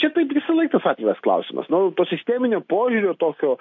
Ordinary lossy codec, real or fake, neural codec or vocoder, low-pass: MP3, 48 kbps; real; none; 7.2 kHz